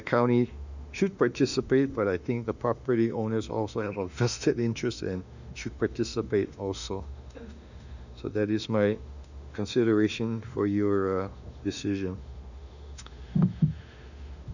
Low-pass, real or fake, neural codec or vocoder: 7.2 kHz; fake; autoencoder, 48 kHz, 32 numbers a frame, DAC-VAE, trained on Japanese speech